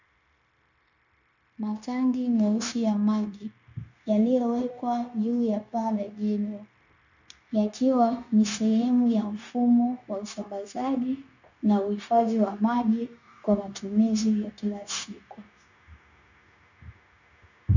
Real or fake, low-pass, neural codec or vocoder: fake; 7.2 kHz; codec, 16 kHz, 0.9 kbps, LongCat-Audio-Codec